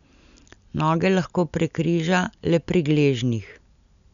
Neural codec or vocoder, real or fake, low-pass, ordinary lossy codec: none; real; 7.2 kHz; none